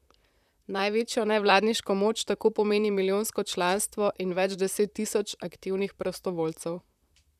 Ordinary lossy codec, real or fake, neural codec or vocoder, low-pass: none; fake; vocoder, 44.1 kHz, 128 mel bands, Pupu-Vocoder; 14.4 kHz